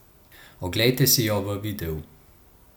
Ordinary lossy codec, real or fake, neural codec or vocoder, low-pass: none; real; none; none